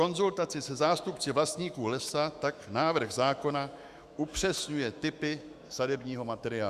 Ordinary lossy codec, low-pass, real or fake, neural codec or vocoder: Opus, 64 kbps; 14.4 kHz; fake; autoencoder, 48 kHz, 128 numbers a frame, DAC-VAE, trained on Japanese speech